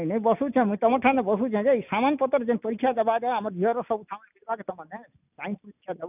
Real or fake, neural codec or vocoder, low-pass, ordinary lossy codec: real; none; 3.6 kHz; none